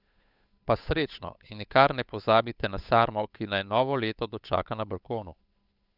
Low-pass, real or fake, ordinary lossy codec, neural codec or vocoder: 5.4 kHz; fake; none; codec, 16 kHz, 8 kbps, FunCodec, trained on Chinese and English, 25 frames a second